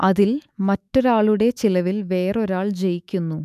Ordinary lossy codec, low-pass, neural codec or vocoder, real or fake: none; 14.4 kHz; autoencoder, 48 kHz, 128 numbers a frame, DAC-VAE, trained on Japanese speech; fake